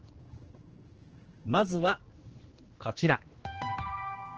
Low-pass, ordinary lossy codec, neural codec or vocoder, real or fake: 7.2 kHz; Opus, 16 kbps; codec, 16 kHz, 2 kbps, X-Codec, HuBERT features, trained on general audio; fake